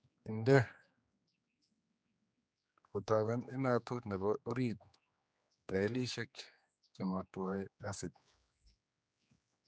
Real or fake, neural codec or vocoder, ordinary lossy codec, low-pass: fake; codec, 16 kHz, 2 kbps, X-Codec, HuBERT features, trained on general audio; none; none